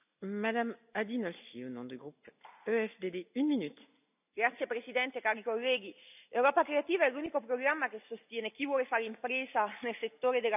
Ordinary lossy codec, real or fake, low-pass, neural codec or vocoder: none; real; 3.6 kHz; none